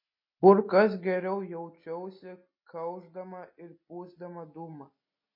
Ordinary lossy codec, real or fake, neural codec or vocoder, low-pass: MP3, 32 kbps; real; none; 5.4 kHz